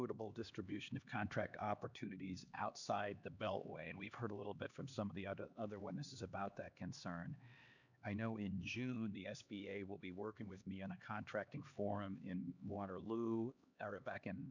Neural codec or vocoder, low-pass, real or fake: codec, 16 kHz, 2 kbps, X-Codec, HuBERT features, trained on LibriSpeech; 7.2 kHz; fake